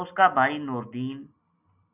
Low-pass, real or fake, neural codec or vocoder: 3.6 kHz; real; none